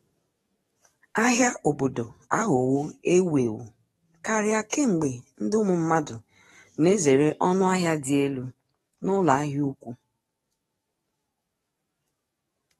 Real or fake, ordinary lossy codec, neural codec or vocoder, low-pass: fake; AAC, 32 kbps; codec, 44.1 kHz, 7.8 kbps, DAC; 19.8 kHz